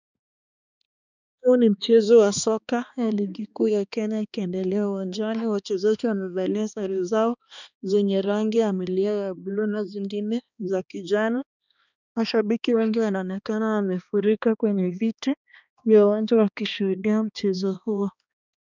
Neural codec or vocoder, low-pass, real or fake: codec, 16 kHz, 2 kbps, X-Codec, HuBERT features, trained on balanced general audio; 7.2 kHz; fake